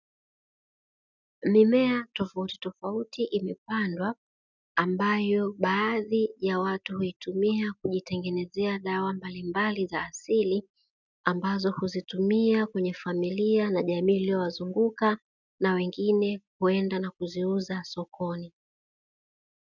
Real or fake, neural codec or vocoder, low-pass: real; none; 7.2 kHz